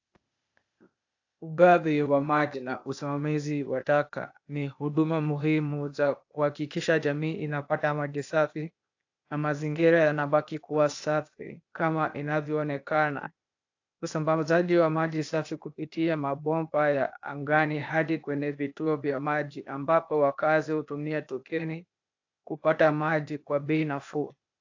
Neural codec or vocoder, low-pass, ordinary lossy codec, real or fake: codec, 16 kHz, 0.8 kbps, ZipCodec; 7.2 kHz; AAC, 48 kbps; fake